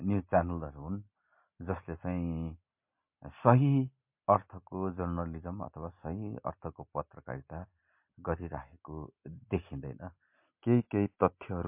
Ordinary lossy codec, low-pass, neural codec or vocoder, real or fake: MP3, 32 kbps; 3.6 kHz; none; real